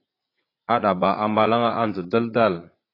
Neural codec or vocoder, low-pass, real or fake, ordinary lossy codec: vocoder, 44.1 kHz, 80 mel bands, Vocos; 5.4 kHz; fake; AAC, 24 kbps